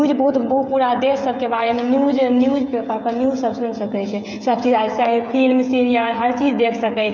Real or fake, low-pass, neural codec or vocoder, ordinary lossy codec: fake; none; codec, 16 kHz, 16 kbps, FreqCodec, smaller model; none